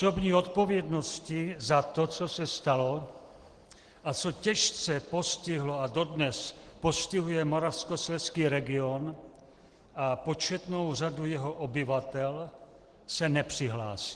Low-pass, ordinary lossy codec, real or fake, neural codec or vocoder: 10.8 kHz; Opus, 16 kbps; real; none